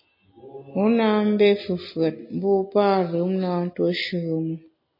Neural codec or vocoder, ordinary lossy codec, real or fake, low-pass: none; MP3, 24 kbps; real; 5.4 kHz